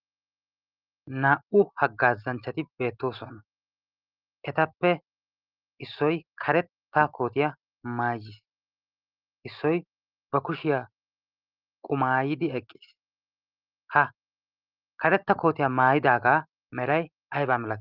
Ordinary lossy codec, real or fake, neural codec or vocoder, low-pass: Opus, 32 kbps; real; none; 5.4 kHz